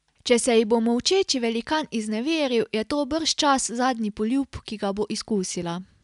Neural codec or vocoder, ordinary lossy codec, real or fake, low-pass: none; none; real; 10.8 kHz